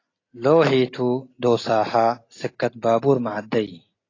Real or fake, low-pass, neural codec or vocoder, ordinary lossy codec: real; 7.2 kHz; none; AAC, 32 kbps